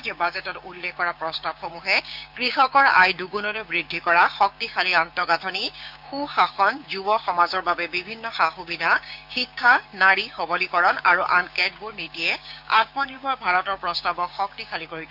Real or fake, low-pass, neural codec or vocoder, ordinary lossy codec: fake; 5.4 kHz; codec, 16 kHz, 6 kbps, DAC; none